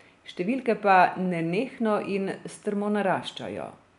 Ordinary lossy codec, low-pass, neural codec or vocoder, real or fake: none; 10.8 kHz; none; real